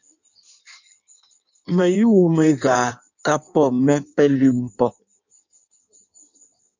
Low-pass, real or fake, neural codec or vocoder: 7.2 kHz; fake; codec, 16 kHz in and 24 kHz out, 1.1 kbps, FireRedTTS-2 codec